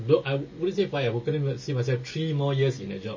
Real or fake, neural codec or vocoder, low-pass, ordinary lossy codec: real; none; 7.2 kHz; MP3, 32 kbps